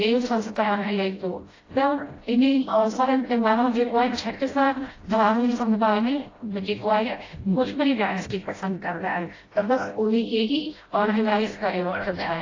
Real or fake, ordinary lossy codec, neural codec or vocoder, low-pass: fake; AAC, 32 kbps; codec, 16 kHz, 0.5 kbps, FreqCodec, smaller model; 7.2 kHz